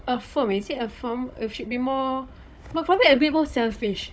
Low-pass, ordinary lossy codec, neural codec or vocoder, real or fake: none; none; codec, 16 kHz, 16 kbps, FunCodec, trained on Chinese and English, 50 frames a second; fake